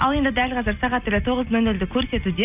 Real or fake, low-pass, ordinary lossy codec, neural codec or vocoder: real; 3.6 kHz; none; none